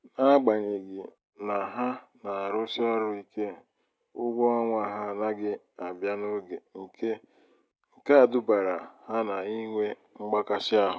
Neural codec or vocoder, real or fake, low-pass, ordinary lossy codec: none; real; none; none